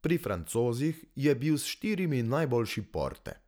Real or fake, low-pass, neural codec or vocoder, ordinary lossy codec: real; none; none; none